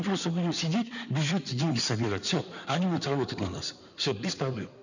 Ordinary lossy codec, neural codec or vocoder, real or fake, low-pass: none; codec, 16 kHz in and 24 kHz out, 2.2 kbps, FireRedTTS-2 codec; fake; 7.2 kHz